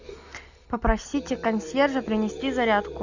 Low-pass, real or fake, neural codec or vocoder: 7.2 kHz; real; none